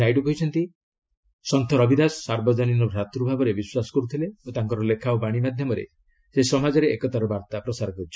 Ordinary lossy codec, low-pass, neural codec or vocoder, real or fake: none; none; none; real